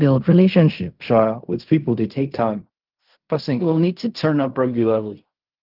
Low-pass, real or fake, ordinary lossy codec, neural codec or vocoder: 5.4 kHz; fake; Opus, 24 kbps; codec, 16 kHz in and 24 kHz out, 0.4 kbps, LongCat-Audio-Codec, fine tuned four codebook decoder